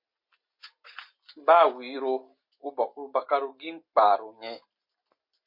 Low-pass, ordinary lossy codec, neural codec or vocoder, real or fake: 5.4 kHz; MP3, 32 kbps; none; real